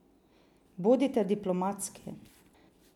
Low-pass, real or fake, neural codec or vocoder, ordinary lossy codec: 19.8 kHz; real; none; none